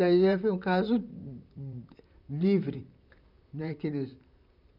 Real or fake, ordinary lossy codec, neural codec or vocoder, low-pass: fake; none; vocoder, 44.1 kHz, 128 mel bands, Pupu-Vocoder; 5.4 kHz